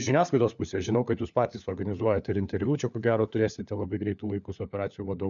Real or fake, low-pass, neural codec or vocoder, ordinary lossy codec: fake; 7.2 kHz; codec, 16 kHz, 4 kbps, FunCodec, trained on LibriTTS, 50 frames a second; MP3, 96 kbps